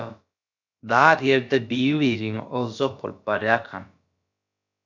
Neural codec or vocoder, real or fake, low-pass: codec, 16 kHz, about 1 kbps, DyCAST, with the encoder's durations; fake; 7.2 kHz